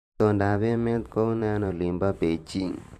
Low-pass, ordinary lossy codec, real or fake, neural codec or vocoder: 14.4 kHz; MP3, 96 kbps; fake; vocoder, 44.1 kHz, 128 mel bands every 512 samples, BigVGAN v2